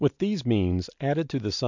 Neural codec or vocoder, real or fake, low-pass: none; real; 7.2 kHz